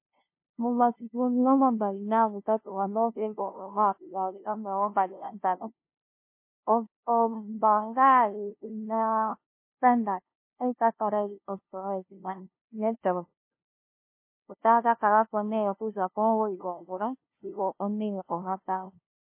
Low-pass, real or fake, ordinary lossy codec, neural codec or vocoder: 3.6 kHz; fake; MP3, 24 kbps; codec, 16 kHz, 0.5 kbps, FunCodec, trained on LibriTTS, 25 frames a second